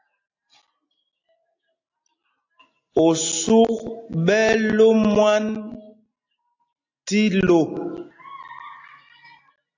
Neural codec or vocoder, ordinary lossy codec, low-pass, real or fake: none; AAC, 48 kbps; 7.2 kHz; real